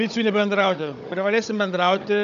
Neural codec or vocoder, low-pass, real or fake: codec, 16 kHz, 16 kbps, FunCodec, trained on Chinese and English, 50 frames a second; 7.2 kHz; fake